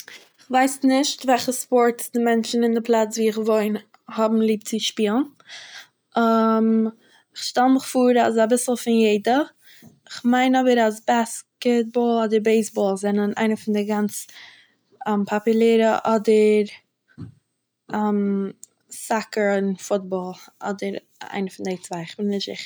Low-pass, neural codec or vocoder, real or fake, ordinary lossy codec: none; none; real; none